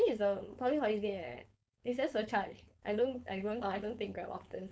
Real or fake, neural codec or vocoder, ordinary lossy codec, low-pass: fake; codec, 16 kHz, 4.8 kbps, FACodec; none; none